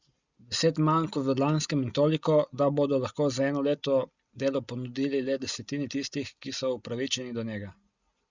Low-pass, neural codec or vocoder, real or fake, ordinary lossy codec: 7.2 kHz; none; real; Opus, 64 kbps